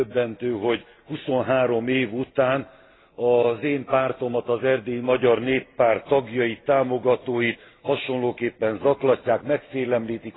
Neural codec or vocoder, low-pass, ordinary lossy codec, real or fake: none; 7.2 kHz; AAC, 16 kbps; real